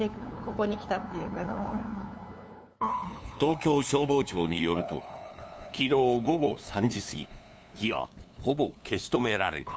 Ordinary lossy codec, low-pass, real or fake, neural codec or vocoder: none; none; fake; codec, 16 kHz, 2 kbps, FunCodec, trained on LibriTTS, 25 frames a second